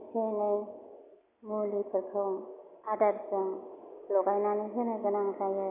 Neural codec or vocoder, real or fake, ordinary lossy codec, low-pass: none; real; none; 3.6 kHz